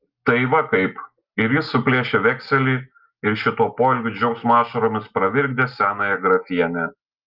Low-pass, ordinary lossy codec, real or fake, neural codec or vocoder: 5.4 kHz; Opus, 32 kbps; real; none